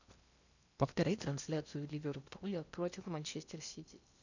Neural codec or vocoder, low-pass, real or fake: codec, 16 kHz in and 24 kHz out, 0.8 kbps, FocalCodec, streaming, 65536 codes; 7.2 kHz; fake